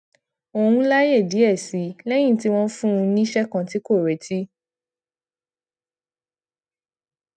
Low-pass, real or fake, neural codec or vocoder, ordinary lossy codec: 9.9 kHz; real; none; none